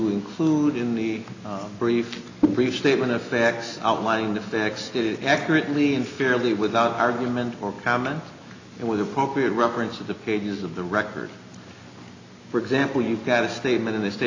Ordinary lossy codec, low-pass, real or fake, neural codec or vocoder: AAC, 48 kbps; 7.2 kHz; real; none